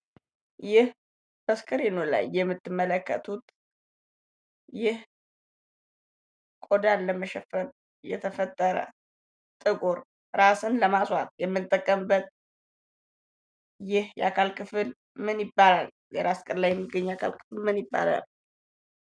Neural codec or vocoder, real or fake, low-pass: none; real; 9.9 kHz